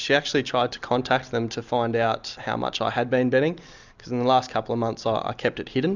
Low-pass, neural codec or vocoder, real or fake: 7.2 kHz; none; real